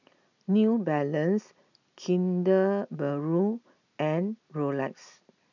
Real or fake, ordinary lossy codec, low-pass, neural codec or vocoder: real; none; 7.2 kHz; none